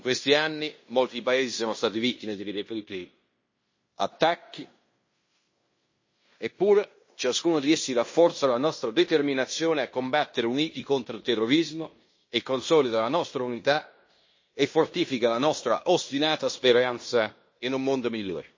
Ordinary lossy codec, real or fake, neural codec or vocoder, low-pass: MP3, 32 kbps; fake; codec, 16 kHz in and 24 kHz out, 0.9 kbps, LongCat-Audio-Codec, fine tuned four codebook decoder; 7.2 kHz